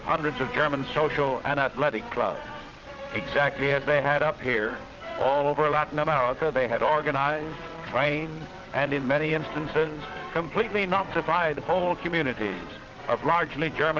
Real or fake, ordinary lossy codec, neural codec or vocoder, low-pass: fake; Opus, 32 kbps; vocoder, 22.05 kHz, 80 mel bands, WaveNeXt; 7.2 kHz